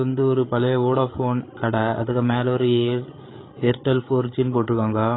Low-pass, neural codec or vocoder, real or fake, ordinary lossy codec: 7.2 kHz; codec, 16 kHz, 8 kbps, FreqCodec, larger model; fake; AAC, 16 kbps